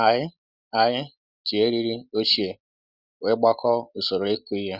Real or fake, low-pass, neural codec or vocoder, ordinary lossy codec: real; 5.4 kHz; none; Opus, 64 kbps